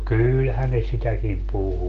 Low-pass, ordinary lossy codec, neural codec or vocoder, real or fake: 7.2 kHz; Opus, 16 kbps; none; real